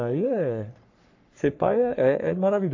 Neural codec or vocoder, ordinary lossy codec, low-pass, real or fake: codec, 44.1 kHz, 3.4 kbps, Pupu-Codec; AAC, 48 kbps; 7.2 kHz; fake